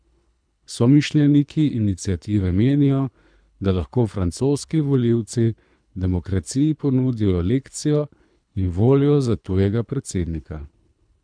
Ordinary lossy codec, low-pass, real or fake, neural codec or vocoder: none; 9.9 kHz; fake; codec, 24 kHz, 3 kbps, HILCodec